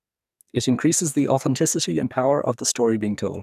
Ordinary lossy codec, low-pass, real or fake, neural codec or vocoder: none; 14.4 kHz; fake; codec, 44.1 kHz, 2.6 kbps, SNAC